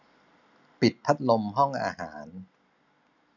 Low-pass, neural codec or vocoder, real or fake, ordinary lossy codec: 7.2 kHz; none; real; none